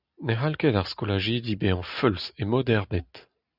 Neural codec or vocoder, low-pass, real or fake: none; 5.4 kHz; real